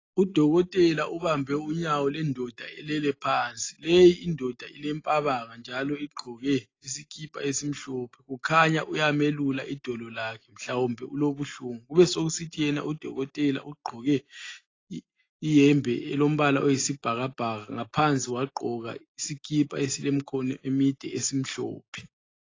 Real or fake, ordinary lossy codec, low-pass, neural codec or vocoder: real; AAC, 32 kbps; 7.2 kHz; none